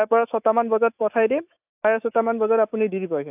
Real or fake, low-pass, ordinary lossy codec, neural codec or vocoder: fake; 3.6 kHz; AAC, 32 kbps; autoencoder, 48 kHz, 128 numbers a frame, DAC-VAE, trained on Japanese speech